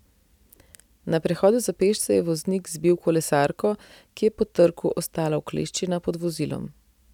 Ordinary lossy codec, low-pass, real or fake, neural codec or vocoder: none; 19.8 kHz; real; none